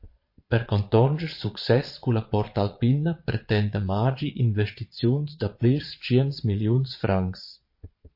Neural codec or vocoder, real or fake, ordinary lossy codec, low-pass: vocoder, 22.05 kHz, 80 mel bands, Vocos; fake; MP3, 32 kbps; 5.4 kHz